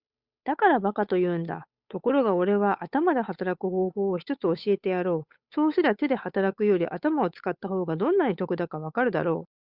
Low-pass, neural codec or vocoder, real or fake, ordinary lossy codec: 5.4 kHz; codec, 16 kHz, 8 kbps, FunCodec, trained on Chinese and English, 25 frames a second; fake; Opus, 64 kbps